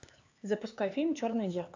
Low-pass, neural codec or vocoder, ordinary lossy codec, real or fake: 7.2 kHz; codec, 16 kHz, 4 kbps, X-Codec, HuBERT features, trained on LibriSpeech; AAC, 48 kbps; fake